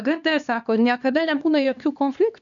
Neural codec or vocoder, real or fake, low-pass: codec, 16 kHz, 2 kbps, X-Codec, HuBERT features, trained on LibriSpeech; fake; 7.2 kHz